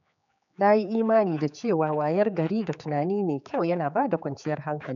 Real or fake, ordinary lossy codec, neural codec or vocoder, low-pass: fake; none; codec, 16 kHz, 4 kbps, X-Codec, HuBERT features, trained on general audio; 7.2 kHz